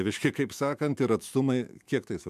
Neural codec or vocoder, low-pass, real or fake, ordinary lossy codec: autoencoder, 48 kHz, 128 numbers a frame, DAC-VAE, trained on Japanese speech; 14.4 kHz; fake; MP3, 96 kbps